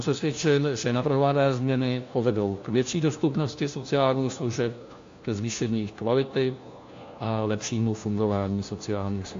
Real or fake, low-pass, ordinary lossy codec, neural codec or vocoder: fake; 7.2 kHz; AAC, 48 kbps; codec, 16 kHz, 1 kbps, FunCodec, trained on LibriTTS, 50 frames a second